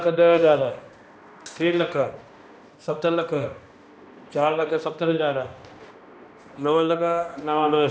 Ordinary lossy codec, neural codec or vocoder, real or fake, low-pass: none; codec, 16 kHz, 1 kbps, X-Codec, HuBERT features, trained on balanced general audio; fake; none